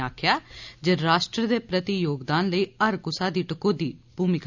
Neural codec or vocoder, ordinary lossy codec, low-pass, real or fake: none; none; 7.2 kHz; real